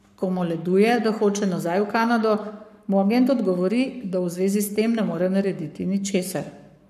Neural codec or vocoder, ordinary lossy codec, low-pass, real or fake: codec, 44.1 kHz, 7.8 kbps, Pupu-Codec; none; 14.4 kHz; fake